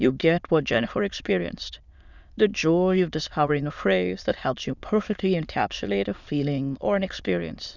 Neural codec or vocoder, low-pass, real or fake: autoencoder, 22.05 kHz, a latent of 192 numbers a frame, VITS, trained on many speakers; 7.2 kHz; fake